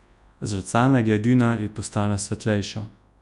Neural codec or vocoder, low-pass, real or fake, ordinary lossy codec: codec, 24 kHz, 0.9 kbps, WavTokenizer, large speech release; 10.8 kHz; fake; none